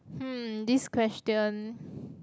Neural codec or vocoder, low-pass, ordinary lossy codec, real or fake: none; none; none; real